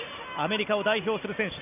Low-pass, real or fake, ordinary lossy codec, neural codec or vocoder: 3.6 kHz; real; none; none